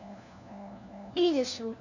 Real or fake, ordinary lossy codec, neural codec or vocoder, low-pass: fake; none; codec, 16 kHz, 1 kbps, FunCodec, trained on LibriTTS, 50 frames a second; 7.2 kHz